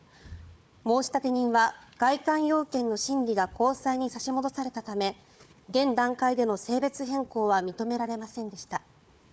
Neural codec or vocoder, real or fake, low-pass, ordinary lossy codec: codec, 16 kHz, 4 kbps, FunCodec, trained on Chinese and English, 50 frames a second; fake; none; none